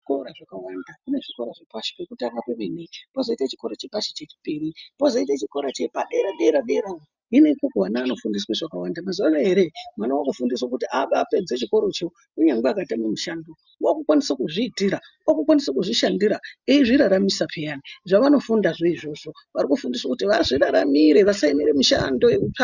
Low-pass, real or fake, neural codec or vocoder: 7.2 kHz; real; none